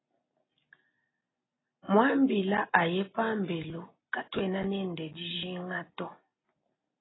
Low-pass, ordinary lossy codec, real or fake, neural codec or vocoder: 7.2 kHz; AAC, 16 kbps; real; none